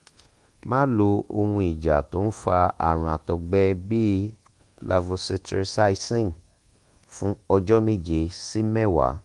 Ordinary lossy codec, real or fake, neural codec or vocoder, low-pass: Opus, 24 kbps; fake; codec, 24 kHz, 1.2 kbps, DualCodec; 10.8 kHz